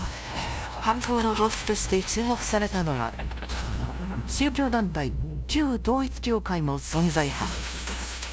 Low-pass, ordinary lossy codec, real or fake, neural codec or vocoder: none; none; fake; codec, 16 kHz, 0.5 kbps, FunCodec, trained on LibriTTS, 25 frames a second